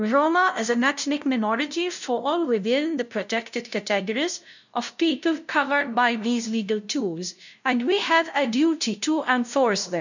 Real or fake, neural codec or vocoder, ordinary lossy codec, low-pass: fake; codec, 16 kHz, 0.5 kbps, FunCodec, trained on LibriTTS, 25 frames a second; none; 7.2 kHz